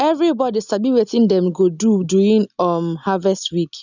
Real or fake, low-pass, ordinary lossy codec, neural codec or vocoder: real; 7.2 kHz; none; none